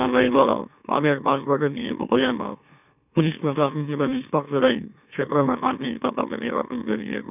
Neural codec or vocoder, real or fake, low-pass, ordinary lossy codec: autoencoder, 44.1 kHz, a latent of 192 numbers a frame, MeloTTS; fake; 3.6 kHz; none